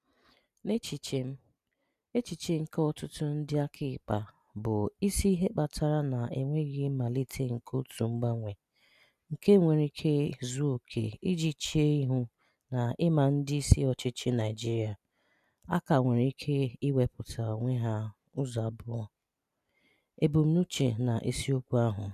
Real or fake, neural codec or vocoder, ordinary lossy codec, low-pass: real; none; none; 14.4 kHz